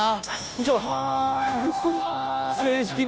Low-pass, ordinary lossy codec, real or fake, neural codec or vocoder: none; none; fake; codec, 16 kHz, 0.5 kbps, FunCodec, trained on Chinese and English, 25 frames a second